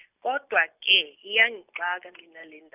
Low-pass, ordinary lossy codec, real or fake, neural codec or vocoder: 3.6 kHz; none; real; none